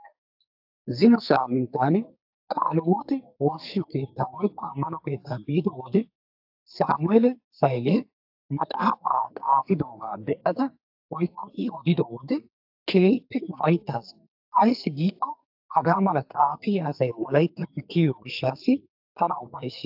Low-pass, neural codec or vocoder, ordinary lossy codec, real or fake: 5.4 kHz; codec, 44.1 kHz, 2.6 kbps, SNAC; AAC, 48 kbps; fake